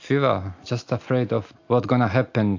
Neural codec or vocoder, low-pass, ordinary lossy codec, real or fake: none; 7.2 kHz; AAC, 48 kbps; real